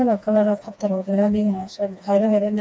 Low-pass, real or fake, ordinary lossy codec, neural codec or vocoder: none; fake; none; codec, 16 kHz, 2 kbps, FreqCodec, smaller model